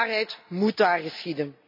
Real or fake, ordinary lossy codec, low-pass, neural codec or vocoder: real; MP3, 32 kbps; 5.4 kHz; none